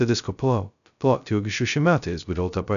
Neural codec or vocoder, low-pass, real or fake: codec, 16 kHz, 0.2 kbps, FocalCodec; 7.2 kHz; fake